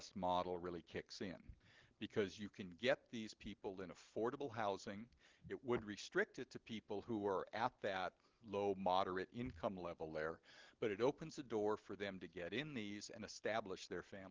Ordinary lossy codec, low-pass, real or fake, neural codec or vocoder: Opus, 16 kbps; 7.2 kHz; real; none